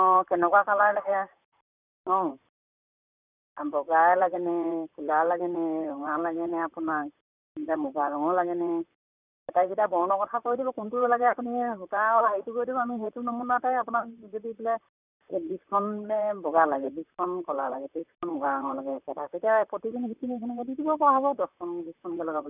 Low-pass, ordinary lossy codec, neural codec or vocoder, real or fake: 3.6 kHz; none; vocoder, 44.1 kHz, 128 mel bands, Pupu-Vocoder; fake